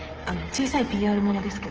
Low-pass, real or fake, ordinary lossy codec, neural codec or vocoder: 7.2 kHz; fake; Opus, 16 kbps; codec, 16 kHz, 16 kbps, FreqCodec, smaller model